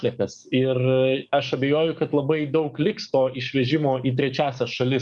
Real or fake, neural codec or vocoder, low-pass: fake; codec, 44.1 kHz, 7.8 kbps, DAC; 10.8 kHz